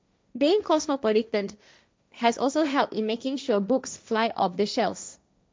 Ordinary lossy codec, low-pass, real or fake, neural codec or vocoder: none; none; fake; codec, 16 kHz, 1.1 kbps, Voila-Tokenizer